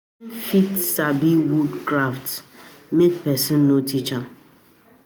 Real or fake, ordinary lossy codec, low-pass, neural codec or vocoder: real; none; none; none